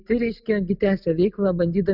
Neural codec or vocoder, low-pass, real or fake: none; 5.4 kHz; real